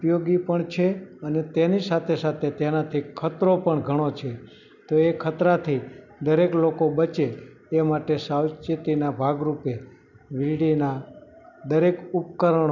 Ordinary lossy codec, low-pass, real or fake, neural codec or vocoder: none; 7.2 kHz; real; none